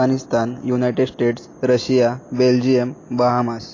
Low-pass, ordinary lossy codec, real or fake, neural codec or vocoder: 7.2 kHz; AAC, 32 kbps; real; none